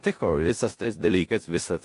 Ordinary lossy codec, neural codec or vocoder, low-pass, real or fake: AAC, 48 kbps; codec, 16 kHz in and 24 kHz out, 0.4 kbps, LongCat-Audio-Codec, four codebook decoder; 10.8 kHz; fake